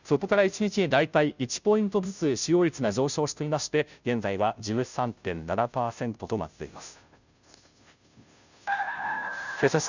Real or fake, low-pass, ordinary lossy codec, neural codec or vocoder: fake; 7.2 kHz; none; codec, 16 kHz, 0.5 kbps, FunCodec, trained on Chinese and English, 25 frames a second